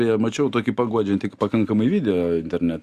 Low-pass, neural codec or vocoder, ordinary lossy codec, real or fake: 14.4 kHz; none; Opus, 64 kbps; real